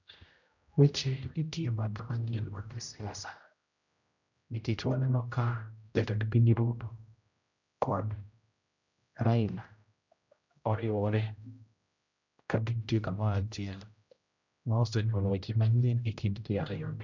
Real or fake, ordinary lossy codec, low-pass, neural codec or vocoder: fake; none; 7.2 kHz; codec, 16 kHz, 0.5 kbps, X-Codec, HuBERT features, trained on general audio